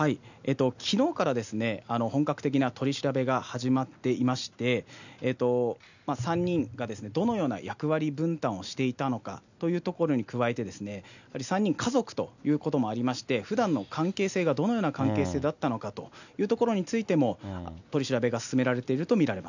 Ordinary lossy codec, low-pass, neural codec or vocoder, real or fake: none; 7.2 kHz; none; real